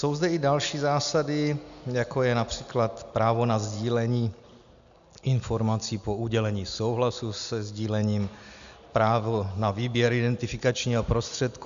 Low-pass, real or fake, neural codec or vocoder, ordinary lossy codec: 7.2 kHz; real; none; AAC, 96 kbps